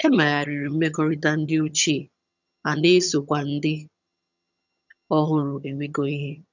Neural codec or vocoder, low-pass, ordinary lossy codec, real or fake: vocoder, 22.05 kHz, 80 mel bands, HiFi-GAN; 7.2 kHz; none; fake